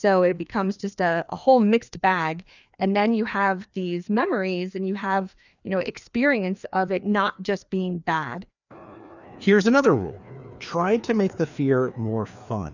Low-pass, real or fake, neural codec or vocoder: 7.2 kHz; fake; codec, 16 kHz, 2 kbps, FreqCodec, larger model